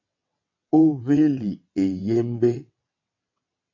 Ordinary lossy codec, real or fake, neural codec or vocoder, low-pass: AAC, 48 kbps; fake; vocoder, 22.05 kHz, 80 mel bands, WaveNeXt; 7.2 kHz